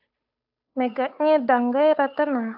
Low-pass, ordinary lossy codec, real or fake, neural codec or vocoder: 5.4 kHz; AAC, 48 kbps; fake; codec, 16 kHz, 8 kbps, FunCodec, trained on Chinese and English, 25 frames a second